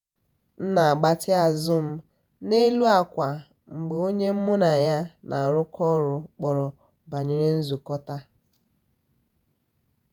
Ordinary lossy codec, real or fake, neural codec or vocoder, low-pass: none; fake; vocoder, 48 kHz, 128 mel bands, Vocos; none